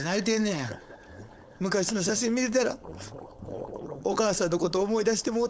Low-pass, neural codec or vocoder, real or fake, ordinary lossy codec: none; codec, 16 kHz, 4.8 kbps, FACodec; fake; none